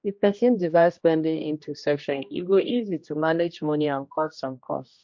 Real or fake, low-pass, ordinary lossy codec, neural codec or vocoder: fake; 7.2 kHz; MP3, 48 kbps; codec, 16 kHz, 1 kbps, X-Codec, HuBERT features, trained on general audio